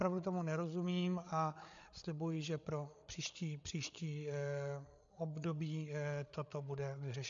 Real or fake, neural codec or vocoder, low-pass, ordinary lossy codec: fake; codec, 16 kHz, 8 kbps, FreqCodec, larger model; 7.2 kHz; AAC, 64 kbps